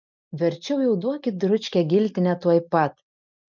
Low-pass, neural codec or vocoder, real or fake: 7.2 kHz; none; real